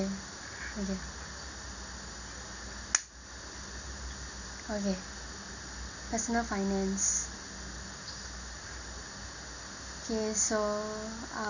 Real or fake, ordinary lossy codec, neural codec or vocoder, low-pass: real; none; none; 7.2 kHz